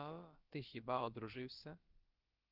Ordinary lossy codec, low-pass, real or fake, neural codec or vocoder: Opus, 32 kbps; 5.4 kHz; fake; codec, 16 kHz, about 1 kbps, DyCAST, with the encoder's durations